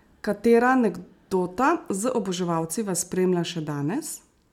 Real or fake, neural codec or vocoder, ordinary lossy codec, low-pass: real; none; MP3, 96 kbps; 19.8 kHz